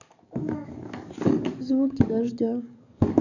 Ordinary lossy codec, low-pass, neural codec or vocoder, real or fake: none; 7.2 kHz; codec, 44.1 kHz, 7.8 kbps, DAC; fake